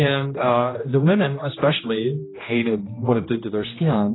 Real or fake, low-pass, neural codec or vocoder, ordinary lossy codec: fake; 7.2 kHz; codec, 16 kHz, 1 kbps, X-Codec, HuBERT features, trained on general audio; AAC, 16 kbps